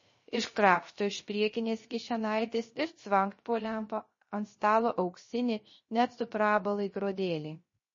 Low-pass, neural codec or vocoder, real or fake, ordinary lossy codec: 7.2 kHz; codec, 16 kHz, 0.3 kbps, FocalCodec; fake; MP3, 32 kbps